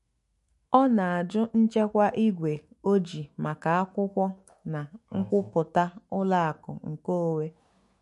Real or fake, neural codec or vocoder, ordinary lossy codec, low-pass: fake; autoencoder, 48 kHz, 128 numbers a frame, DAC-VAE, trained on Japanese speech; MP3, 48 kbps; 14.4 kHz